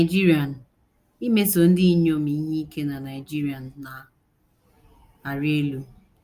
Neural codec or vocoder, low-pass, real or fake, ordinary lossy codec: none; 14.4 kHz; real; Opus, 32 kbps